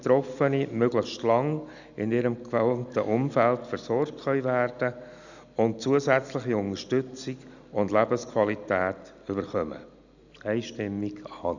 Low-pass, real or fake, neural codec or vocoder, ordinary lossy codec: 7.2 kHz; real; none; none